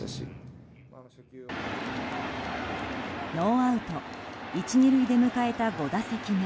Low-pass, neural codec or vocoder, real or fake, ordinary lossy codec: none; none; real; none